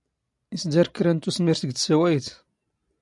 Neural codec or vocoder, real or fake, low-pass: none; real; 10.8 kHz